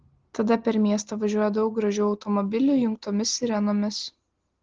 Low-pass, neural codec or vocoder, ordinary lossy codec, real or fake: 7.2 kHz; none; Opus, 16 kbps; real